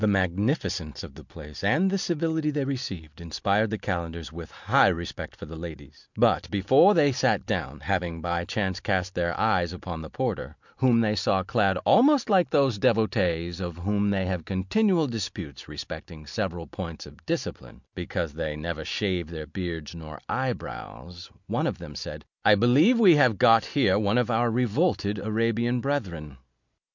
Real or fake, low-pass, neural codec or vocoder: real; 7.2 kHz; none